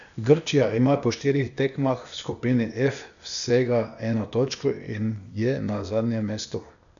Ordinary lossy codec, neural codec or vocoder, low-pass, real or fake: none; codec, 16 kHz, 0.8 kbps, ZipCodec; 7.2 kHz; fake